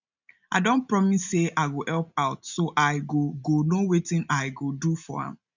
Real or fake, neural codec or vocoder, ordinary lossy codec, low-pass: real; none; none; 7.2 kHz